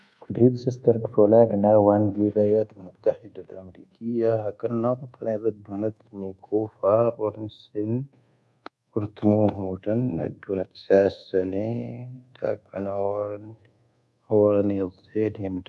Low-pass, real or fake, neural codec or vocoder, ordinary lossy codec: none; fake; codec, 24 kHz, 1.2 kbps, DualCodec; none